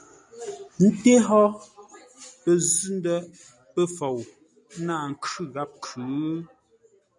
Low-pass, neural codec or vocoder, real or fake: 10.8 kHz; none; real